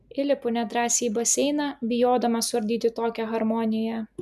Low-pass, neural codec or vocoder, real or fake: 14.4 kHz; none; real